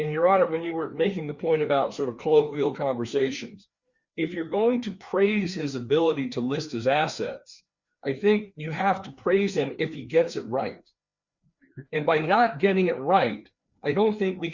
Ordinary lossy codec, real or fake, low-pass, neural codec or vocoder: Opus, 64 kbps; fake; 7.2 kHz; codec, 16 kHz, 2 kbps, FreqCodec, larger model